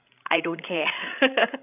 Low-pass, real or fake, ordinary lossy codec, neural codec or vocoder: 3.6 kHz; fake; none; codec, 16 kHz, 16 kbps, FreqCodec, larger model